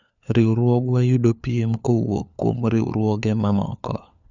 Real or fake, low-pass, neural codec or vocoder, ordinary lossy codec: fake; 7.2 kHz; codec, 16 kHz, 4 kbps, FunCodec, trained on LibriTTS, 50 frames a second; none